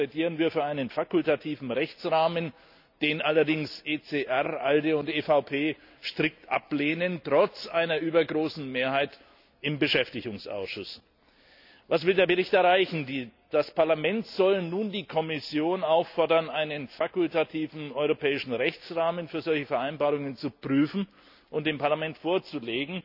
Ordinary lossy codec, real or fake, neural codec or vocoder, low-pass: none; real; none; 5.4 kHz